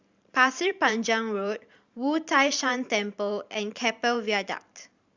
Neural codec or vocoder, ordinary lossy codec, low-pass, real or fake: vocoder, 44.1 kHz, 128 mel bands every 512 samples, BigVGAN v2; Opus, 64 kbps; 7.2 kHz; fake